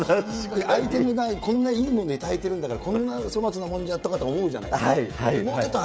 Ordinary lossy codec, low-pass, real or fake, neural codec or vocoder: none; none; fake; codec, 16 kHz, 16 kbps, FreqCodec, smaller model